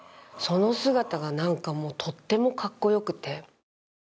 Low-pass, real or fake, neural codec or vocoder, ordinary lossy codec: none; real; none; none